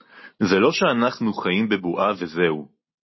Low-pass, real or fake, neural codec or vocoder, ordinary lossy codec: 7.2 kHz; real; none; MP3, 24 kbps